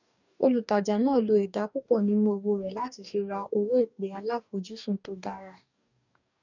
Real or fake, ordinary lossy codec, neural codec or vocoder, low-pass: fake; none; codec, 44.1 kHz, 2.6 kbps, DAC; 7.2 kHz